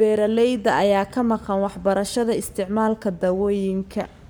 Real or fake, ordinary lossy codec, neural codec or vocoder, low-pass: fake; none; codec, 44.1 kHz, 7.8 kbps, Pupu-Codec; none